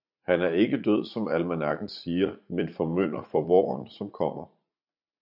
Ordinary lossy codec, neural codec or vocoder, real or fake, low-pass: MP3, 48 kbps; vocoder, 44.1 kHz, 80 mel bands, Vocos; fake; 5.4 kHz